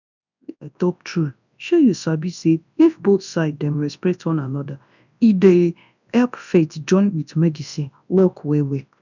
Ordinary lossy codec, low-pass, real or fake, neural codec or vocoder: none; 7.2 kHz; fake; codec, 24 kHz, 0.9 kbps, WavTokenizer, large speech release